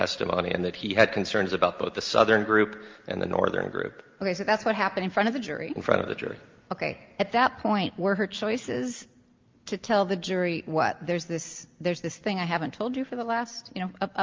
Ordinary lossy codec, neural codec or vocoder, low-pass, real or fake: Opus, 32 kbps; none; 7.2 kHz; real